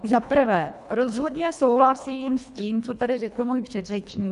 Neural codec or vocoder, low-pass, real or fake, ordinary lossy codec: codec, 24 kHz, 1.5 kbps, HILCodec; 10.8 kHz; fake; AAC, 96 kbps